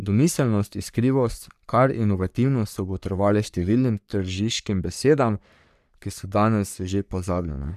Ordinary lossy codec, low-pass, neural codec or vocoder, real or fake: none; 14.4 kHz; codec, 44.1 kHz, 3.4 kbps, Pupu-Codec; fake